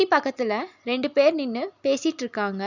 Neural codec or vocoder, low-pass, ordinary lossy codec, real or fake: none; 7.2 kHz; none; real